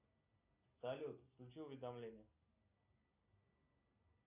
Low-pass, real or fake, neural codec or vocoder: 3.6 kHz; real; none